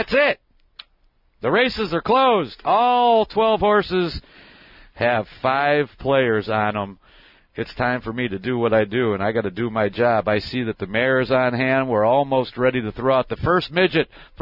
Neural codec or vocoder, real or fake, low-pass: none; real; 5.4 kHz